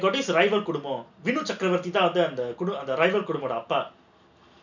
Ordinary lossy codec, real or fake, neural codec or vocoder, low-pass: none; real; none; 7.2 kHz